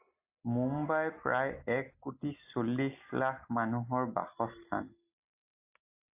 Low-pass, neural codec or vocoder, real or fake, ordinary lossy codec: 3.6 kHz; none; real; AAC, 32 kbps